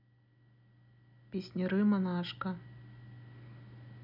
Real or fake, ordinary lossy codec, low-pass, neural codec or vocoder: real; none; 5.4 kHz; none